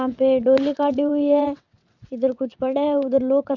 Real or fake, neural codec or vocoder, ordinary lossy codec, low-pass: fake; vocoder, 22.05 kHz, 80 mel bands, WaveNeXt; none; 7.2 kHz